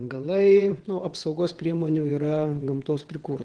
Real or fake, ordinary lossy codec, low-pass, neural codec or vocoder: fake; Opus, 16 kbps; 9.9 kHz; vocoder, 22.05 kHz, 80 mel bands, WaveNeXt